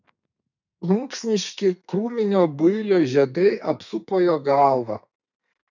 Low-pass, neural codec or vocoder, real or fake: 7.2 kHz; codec, 32 kHz, 1.9 kbps, SNAC; fake